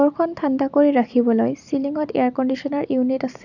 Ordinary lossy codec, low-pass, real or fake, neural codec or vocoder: none; 7.2 kHz; real; none